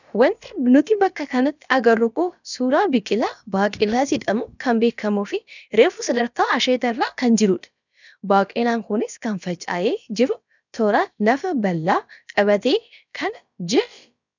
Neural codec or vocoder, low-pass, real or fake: codec, 16 kHz, about 1 kbps, DyCAST, with the encoder's durations; 7.2 kHz; fake